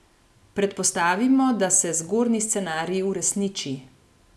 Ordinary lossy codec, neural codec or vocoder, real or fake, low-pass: none; none; real; none